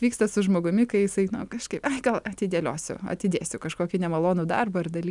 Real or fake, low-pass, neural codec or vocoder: real; 10.8 kHz; none